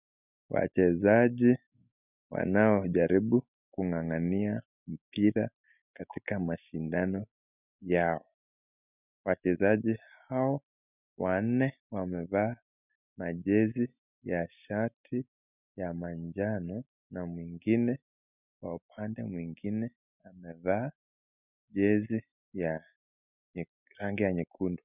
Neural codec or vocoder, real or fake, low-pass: none; real; 3.6 kHz